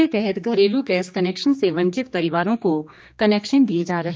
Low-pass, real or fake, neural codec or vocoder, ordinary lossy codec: none; fake; codec, 16 kHz, 2 kbps, X-Codec, HuBERT features, trained on general audio; none